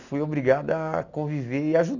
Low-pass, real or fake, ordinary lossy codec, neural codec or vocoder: 7.2 kHz; real; none; none